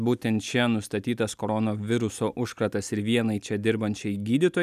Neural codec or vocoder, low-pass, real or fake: none; 14.4 kHz; real